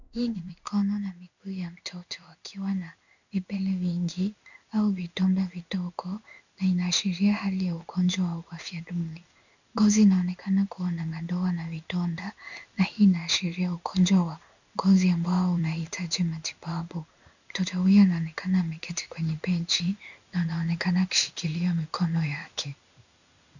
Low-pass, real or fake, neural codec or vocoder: 7.2 kHz; fake; codec, 16 kHz in and 24 kHz out, 1 kbps, XY-Tokenizer